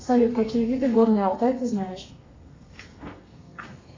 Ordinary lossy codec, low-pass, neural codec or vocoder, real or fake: AAC, 32 kbps; 7.2 kHz; codec, 32 kHz, 1.9 kbps, SNAC; fake